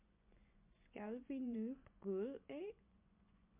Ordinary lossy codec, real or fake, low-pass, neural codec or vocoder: MP3, 32 kbps; fake; 3.6 kHz; vocoder, 24 kHz, 100 mel bands, Vocos